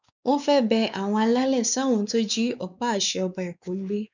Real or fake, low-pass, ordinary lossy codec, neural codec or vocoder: fake; 7.2 kHz; none; codec, 16 kHz, 4 kbps, X-Codec, WavLM features, trained on Multilingual LibriSpeech